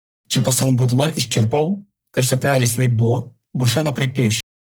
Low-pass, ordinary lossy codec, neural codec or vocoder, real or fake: none; none; codec, 44.1 kHz, 1.7 kbps, Pupu-Codec; fake